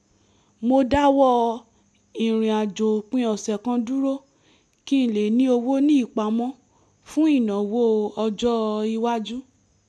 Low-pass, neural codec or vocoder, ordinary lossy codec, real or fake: none; none; none; real